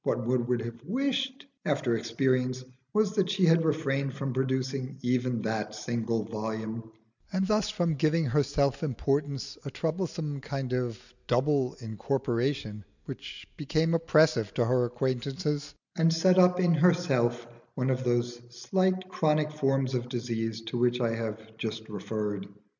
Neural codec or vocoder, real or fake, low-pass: none; real; 7.2 kHz